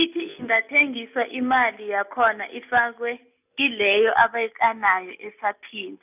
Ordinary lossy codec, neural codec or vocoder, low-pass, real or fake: none; none; 3.6 kHz; real